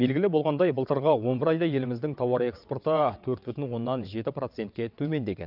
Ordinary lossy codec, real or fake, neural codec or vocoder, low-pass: none; fake; vocoder, 22.05 kHz, 80 mel bands, WaveNeXt; 5.4 kHz